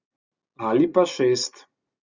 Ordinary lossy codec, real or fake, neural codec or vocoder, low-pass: Opus, 64 kbps; real; none; 7.2 kHz